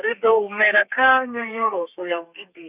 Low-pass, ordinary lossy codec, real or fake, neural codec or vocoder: 3.6 kHz; none; fake; codec, 32 kHz, 1.9 kbps, SNAC